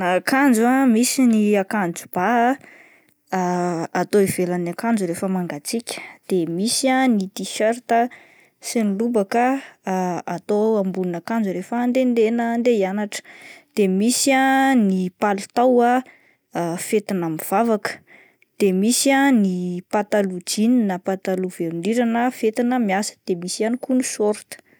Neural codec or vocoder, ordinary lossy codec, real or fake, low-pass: none; none; real; none